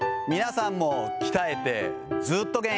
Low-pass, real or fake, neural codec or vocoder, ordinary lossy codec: none; real; none; none